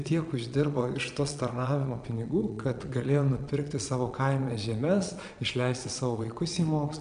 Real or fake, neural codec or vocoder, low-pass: fake; vocoder, 22.05 kHz, 80 mel bands, Vocos; 9.9 kHz